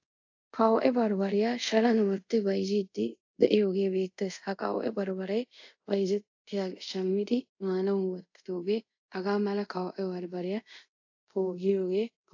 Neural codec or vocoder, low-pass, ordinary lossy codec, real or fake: codec, 24 kHz, 0.5 kbps, DualCodec; 7.2 kHz; AAC, 48 kbps; fake